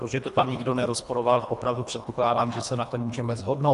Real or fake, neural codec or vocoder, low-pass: fake; codec, 24 kHz, 1.5 kbps, HILCodec; 10.8 kHz